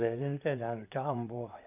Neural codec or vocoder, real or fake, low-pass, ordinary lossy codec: vocoder, 44.1 kHz, 128 mel bands, Pupu-Vocoder; fake; 3.6 kHz; none